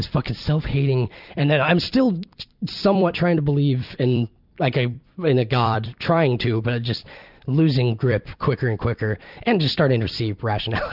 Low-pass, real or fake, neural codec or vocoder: 5.4 kHz; fake; vocoder, 44.1 kHz, 128 mel bands every 256 samples, BigVGAN v2